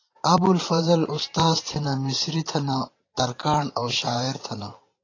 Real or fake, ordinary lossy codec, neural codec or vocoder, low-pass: fake; AAC, 32 kbps; vocoder, 44.1 kHz, 128 mel bands every 512 samples, BigVGAN v2; 7.2 kHz